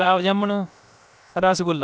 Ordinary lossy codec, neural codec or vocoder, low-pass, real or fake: none; codec, 16 kHz, about 1 kbps, DyCAST, with the encoder's durations; none; fake